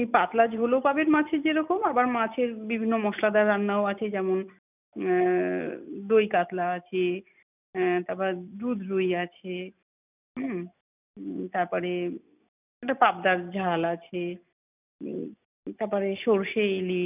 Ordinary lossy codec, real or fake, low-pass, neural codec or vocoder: none; real; 3.6 kHz; none